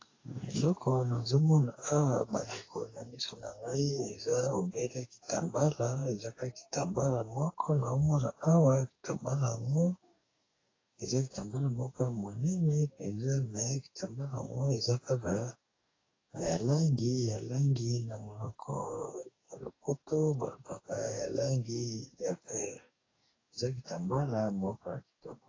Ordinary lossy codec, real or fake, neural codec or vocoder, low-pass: AAC, 32 kbps; fake; codec, 44.1 kHz, 2.6 kbps, DAC; 7.2 kHz